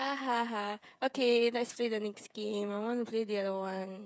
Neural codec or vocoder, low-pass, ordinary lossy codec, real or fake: codec, 16 kHz, 8 kbps, FreqCodec, smaller model; none; none; fake